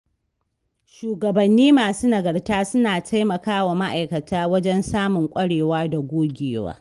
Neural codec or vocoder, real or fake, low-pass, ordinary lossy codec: none; real; 10.8 kHz; Opus, 24 kbps